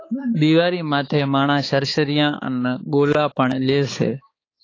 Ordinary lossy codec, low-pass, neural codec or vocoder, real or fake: AAC, 32 kbps; 7.2 kHz; codec, 16 kHz, 4 kbps, X-Codec, HuBERT features, trained on balanced general audio; fake